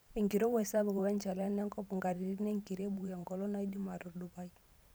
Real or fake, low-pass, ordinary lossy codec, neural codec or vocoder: fake; none; none; vocoder, 44.1 kHz, 128 mel bands every 512 samples, BigVGAN v2